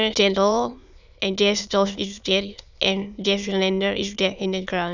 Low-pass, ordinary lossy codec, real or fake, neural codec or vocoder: 7.2 kHz; none; fake; autoencoder, 22.05 kHz, a latent of 192 numbers a frame, VITS, trained on many speakers